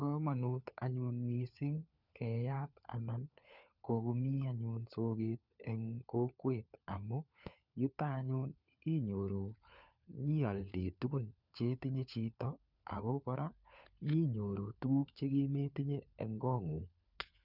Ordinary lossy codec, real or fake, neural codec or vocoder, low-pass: none; fake; codec, 16 kHz, 4 kbps, FunCodec, trained on LibriTTS, 50 frames a second; 5.4 kHz